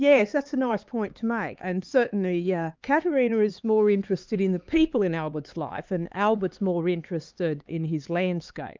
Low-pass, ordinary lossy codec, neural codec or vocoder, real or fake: 7.2 kHz; Opus, 24 kbps; codec, 16 kHz, 4 kbps, X-Codec, HuBERT features, trained on LibriSpeech; fake